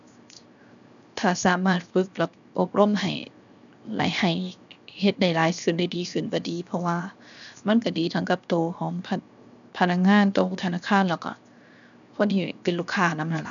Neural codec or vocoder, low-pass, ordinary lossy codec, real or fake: codec, 16 kHz, 0.7 kbps, FocalCodec; 7.2 kHz; none; fake